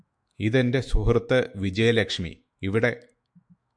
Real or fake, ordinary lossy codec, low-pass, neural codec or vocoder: fake; MP3, 64 kbps; 9.9 kHz; autoencoder, 48 kHz, 128 numbers a frame, DAC-VAE, trained on Japanese speech